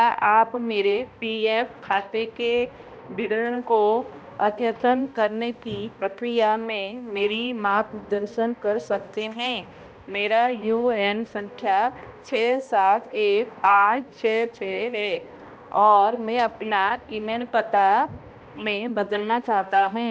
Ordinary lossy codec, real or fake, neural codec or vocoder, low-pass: none; fake; codec, 16 kHz, 1 kbps, X-Codec, HuBERT features, trained on balanced general audio; none